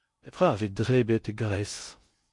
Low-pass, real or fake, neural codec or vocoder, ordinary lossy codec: 10.8 kHz; fake; codec, 16 kHz in and 24 kHz out, 0.6 kbps, FocalCodec, streaming, 2048 codes; MP3, 48 kbps